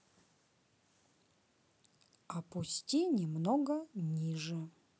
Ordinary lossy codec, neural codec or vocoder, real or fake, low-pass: none; none; real; none